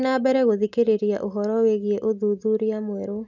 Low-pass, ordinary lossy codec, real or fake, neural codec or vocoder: 7.2 kHz; none; real; none